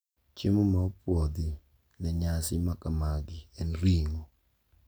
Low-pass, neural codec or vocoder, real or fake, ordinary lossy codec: none; none; real; none